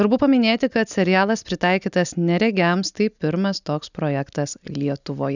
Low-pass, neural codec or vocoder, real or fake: 7.2 kHz; none; real